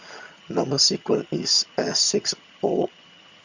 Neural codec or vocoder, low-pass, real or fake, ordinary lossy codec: vocoder, 22.05 kHz, 80 mel bands, HiFi-GAN; 7.2 kHz; fake; Opus, 64 kbps